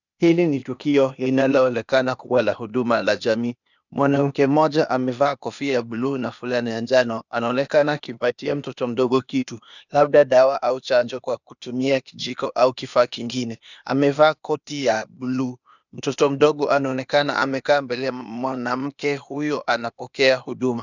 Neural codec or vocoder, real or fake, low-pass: codec, 16 kHz, 0.8 kbps, ZipCodec; fake; 7.2 kHz